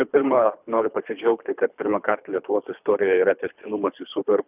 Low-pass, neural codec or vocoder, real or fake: 3.6 kHz; codec, 24 kHz, 3 kbps, HILCodec; fake